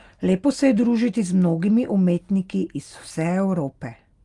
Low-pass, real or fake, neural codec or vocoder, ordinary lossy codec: 10.8 kHz; real; none; Opus, 32 kbps